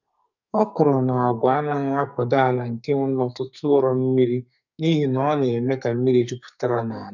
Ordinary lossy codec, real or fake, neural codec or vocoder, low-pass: none; fake; codec, 44.1 kHz, 2.6 kbps, SNAC; 7.2 kHz